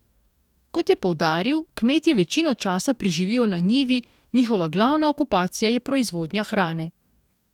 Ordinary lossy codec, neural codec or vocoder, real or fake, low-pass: none; codec, 44.1 kHz, 2.6 kbps, DAC; fake; 19.8 kHz